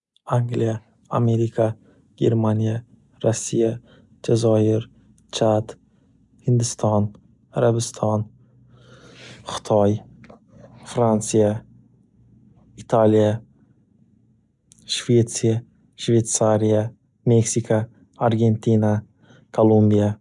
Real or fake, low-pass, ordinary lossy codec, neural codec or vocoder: real; 10.8 kHz; none; none